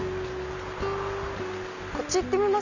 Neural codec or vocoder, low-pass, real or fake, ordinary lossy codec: none; 7.2 kHz; real; none